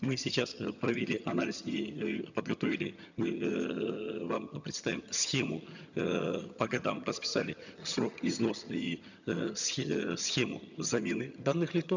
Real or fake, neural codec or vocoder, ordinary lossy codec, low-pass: fake; vocoder, 22.05 kHz, 80 mel bands, HiFi-GAN; none; 7.2 kHz